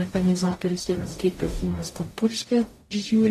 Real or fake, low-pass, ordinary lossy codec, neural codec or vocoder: fake; 14.4 kHz; AAC, 48 kbps; codec, 44.1 kHz, 0.9 kbps, DAC